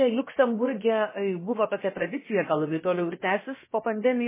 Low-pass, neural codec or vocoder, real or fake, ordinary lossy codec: 3.6 kHz; codec, 16 kHz, about 1 kbps, DyCAST, with the encoder's durations; fake; MP3, 16 kbps